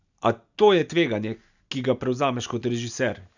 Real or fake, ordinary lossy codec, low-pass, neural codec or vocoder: real; none; 7.2 kHz; none